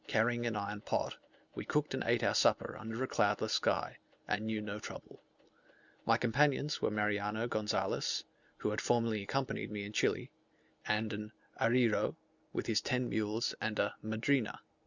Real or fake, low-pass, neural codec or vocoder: real; 7.2 kHz; none